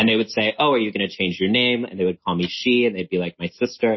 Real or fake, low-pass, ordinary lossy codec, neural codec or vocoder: real; 7.2 kHz; MP3, 24 kbps; none